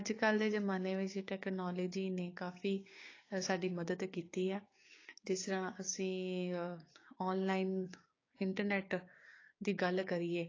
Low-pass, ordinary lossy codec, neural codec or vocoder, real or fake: 7.2 kHz; AAC, 32 kbps; codec, 16 kHz, 6 kbps, DAC; fake